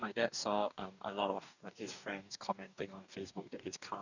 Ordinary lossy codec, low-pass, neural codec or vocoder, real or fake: none; 7.2 kHz; codec, 44.1 kHz, 2.6 kbps, DAC; fake